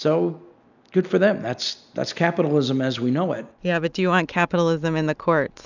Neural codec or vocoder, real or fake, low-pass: none; real; 7.2 kHz